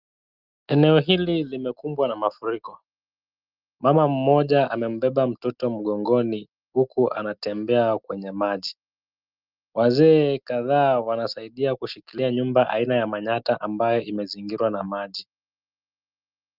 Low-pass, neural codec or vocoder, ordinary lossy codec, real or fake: 5.4 kHz; none; Opus, 32 kbps; real